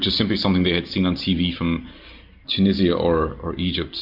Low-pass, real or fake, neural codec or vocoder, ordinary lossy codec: 5.4 kHz; real; none; MP3, 48 kbps